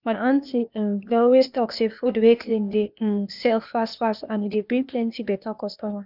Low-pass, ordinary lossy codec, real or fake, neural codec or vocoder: 5.4 kHz; none; fake; codec, 16 kHz, 0.8 kbps, ZipCodec